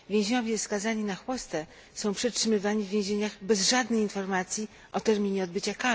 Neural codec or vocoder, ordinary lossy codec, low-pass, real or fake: none; none; none; real